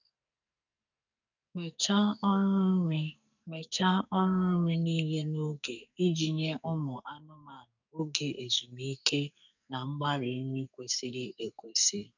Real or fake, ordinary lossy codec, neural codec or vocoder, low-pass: fake; none; codec, 44.1 kHz, 2.6 kbps, SNAC; 7.2 kHz